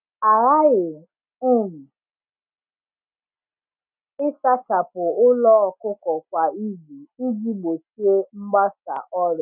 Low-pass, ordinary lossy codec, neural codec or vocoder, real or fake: 3.6 kHz; none; none; real